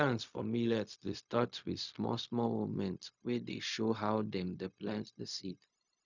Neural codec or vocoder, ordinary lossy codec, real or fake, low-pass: codec, 16 kHz, 0.4 kbps, LongCat-Audio-Codec; none; fake; 7.2 kHz